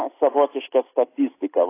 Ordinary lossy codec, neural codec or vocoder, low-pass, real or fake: AAC, 24 kbps; none; 3.6 kHz; real